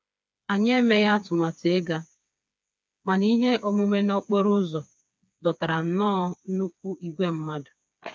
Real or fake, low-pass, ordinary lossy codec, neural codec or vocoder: fake; none; none; codec, 16 kHz, 4 kbps, FreqCodec, smaller model